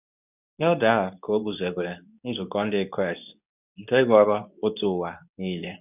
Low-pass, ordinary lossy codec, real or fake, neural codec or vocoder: 3.6 kHz; none; fake; codec, 24 kHz, 0.9 kbps, WavTokenizer, medium speech release version 2